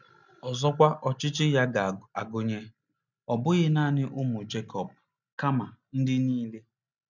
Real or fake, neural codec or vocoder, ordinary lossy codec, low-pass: real; none; none; 7.2 kHz